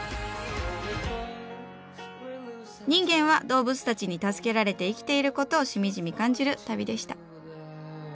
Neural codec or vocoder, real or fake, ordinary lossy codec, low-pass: none; real; none; none